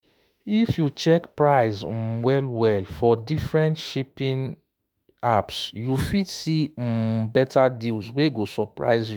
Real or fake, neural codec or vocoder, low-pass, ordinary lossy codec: fake; autoencoder, 48 kHz, 32 numbers a frame, DAC-VAE, trained on Japanese speech; none; none